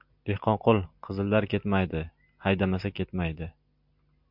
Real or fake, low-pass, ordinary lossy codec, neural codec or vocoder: real; 5.4 kHz; MP3, 48 kbps; none